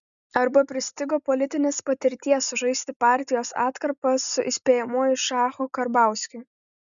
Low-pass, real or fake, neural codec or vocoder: 7.2 kHz; real; none